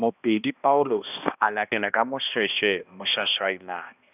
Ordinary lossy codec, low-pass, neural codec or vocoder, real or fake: none; 3.6 kHz; codec, 16 kHz, 1 kbps, X-Codec, HuBERT features, trained on balanced general audio; fake